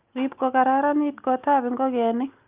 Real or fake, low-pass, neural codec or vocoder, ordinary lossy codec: real; 3.6 kHz; none; Opus, 24 kbps